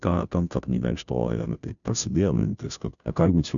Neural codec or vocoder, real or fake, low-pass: codec, 16 kHz, 0.5 kbps, FunCodec, trained on Chinese and English, 25 frames a second; fake; 7.2 kHz